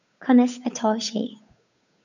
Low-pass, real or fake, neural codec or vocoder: 7.2 kHz; fake; codec, 16 kHz, 8 kbps, FunCodec, trained on Chinese and English, 25 frames a second